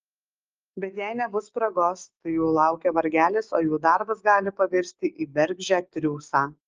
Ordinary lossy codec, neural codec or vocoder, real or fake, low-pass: Opus, 24 kbps; codec, 16 kHz, 6 kbps, DAC; fake; 7.2 kHz